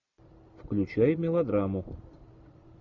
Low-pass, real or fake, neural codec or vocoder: 7.2 kHz; real; none